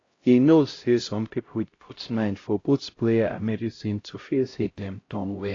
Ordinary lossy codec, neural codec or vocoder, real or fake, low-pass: AAC, 32 kbps; codec, 16 kHz, 0.5 kbps, X-Codec, HuBERT features, trained on LibriSpeech; fake; 7.2 kHz